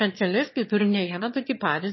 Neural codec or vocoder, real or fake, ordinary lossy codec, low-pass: autoencoder, 22.05 kHz, a latent of 192 numbers a frame, VITS, trained on one speaker; fake; MP3, 24 kbps; 7.2 kHz